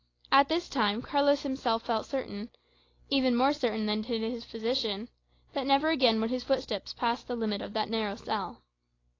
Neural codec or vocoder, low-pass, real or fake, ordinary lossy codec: none; 7.2 kHz; real; AAC, 32 kbps